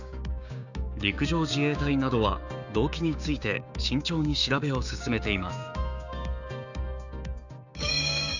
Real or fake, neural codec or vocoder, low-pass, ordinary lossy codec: fake; codec, 16 kHz, 6 kbps, DAC; 7.2 kHz; none